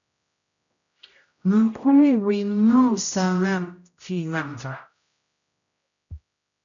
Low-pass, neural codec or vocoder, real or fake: 7.2 kHz; codec, 16 kHz, 0.5 kbps, X-Codec, HuBERT features, trained on general audio; fake